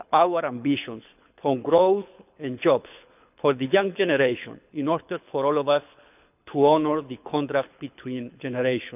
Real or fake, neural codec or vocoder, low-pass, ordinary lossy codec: fake; codec, 24 kHz, 6 kbps, HILCodec; 3.6 kHz; none